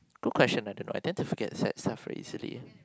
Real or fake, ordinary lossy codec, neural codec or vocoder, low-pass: real; none; none; none